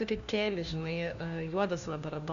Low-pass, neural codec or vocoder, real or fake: 7.2 kHz; codec, 16 kHz, 1 kbps, FunCodec, trained on LibriTTS, 50 frames a second; fake